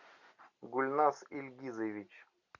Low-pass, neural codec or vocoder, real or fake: 7.2 kHz; none; real